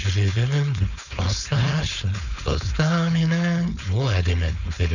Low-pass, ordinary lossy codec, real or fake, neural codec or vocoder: 7.2 kHz; none; fake; codec, 16 kHz, 4.8 kbps, FACodec